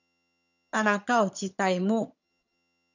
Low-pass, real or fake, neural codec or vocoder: 7.2 kHz; fake; vocoder, 22.05 kHz, 80 mel bands, HiFi-GAN